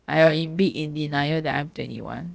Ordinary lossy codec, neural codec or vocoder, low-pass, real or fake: none; codec, 16 kHz, about 1 kbps, DyCAST, with the encoder's durations; none; fake